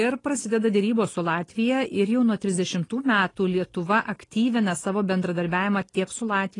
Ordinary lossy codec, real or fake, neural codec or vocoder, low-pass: AAC, 32 kbps; real; none; 10.8 kHz